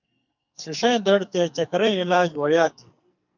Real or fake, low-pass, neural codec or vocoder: fake; 7.2 kHz; codec, 44.1 kHz, 2.6 kbps, SNAC